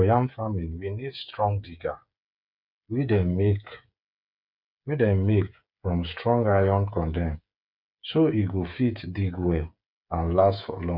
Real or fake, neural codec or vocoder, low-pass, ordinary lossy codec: fake; codec, 16 kHz, 8 kbps, FreqCodec, smaller model; 5.4 kHz; none